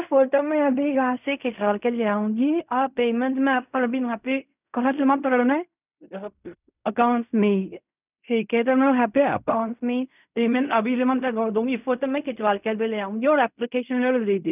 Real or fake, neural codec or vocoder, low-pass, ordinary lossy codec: fake; codec, 16 kHz in and 24 kHz out, 0.4 kbps, LongCat-Audio-Codec, fine tuned four codebook decoder; 3.6 kHz; none